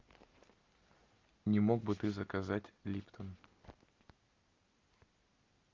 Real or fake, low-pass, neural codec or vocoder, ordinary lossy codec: real; 7.2 kHz; none; Opus, 32 kbps